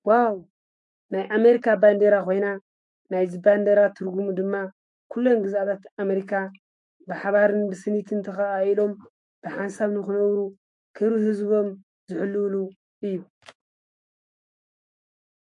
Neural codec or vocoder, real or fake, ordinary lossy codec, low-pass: autoencoder, 48 kHz, 128 numbers a frame, DAC-VAE, trained on Japanese speech; fake; MP3, 48 kbps; 10.8 kHz